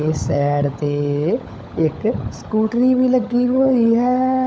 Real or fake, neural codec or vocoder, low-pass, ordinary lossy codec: fake; codec, 16 kHz, 16 kbps, FunCodec, trained on LibriTTS, 50 frames a second; none; none